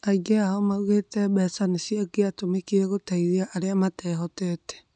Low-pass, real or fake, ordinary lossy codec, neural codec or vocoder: 9.9 kHz; real; none; none